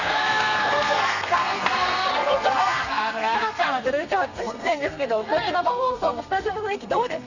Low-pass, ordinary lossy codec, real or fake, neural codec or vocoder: 7.2 kHz; none; fake; codec, 32 kHz, 1.9 kbps, SNAC